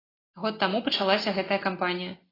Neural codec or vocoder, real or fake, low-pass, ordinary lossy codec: none; real; 5.4 kHz; AAC, 24 kbps